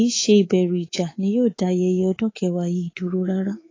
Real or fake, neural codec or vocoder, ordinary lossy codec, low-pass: fake; codec, 24 kHz, 3.1 kbps, DualCodec; AAC, 32 kbps; 7.2 kHz